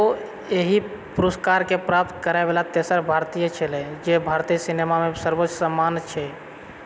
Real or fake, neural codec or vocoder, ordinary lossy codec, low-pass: real; none; none; none